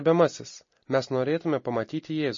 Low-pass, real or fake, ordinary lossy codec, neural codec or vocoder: 7.2 kHz; real; MP3, 32 kbps; none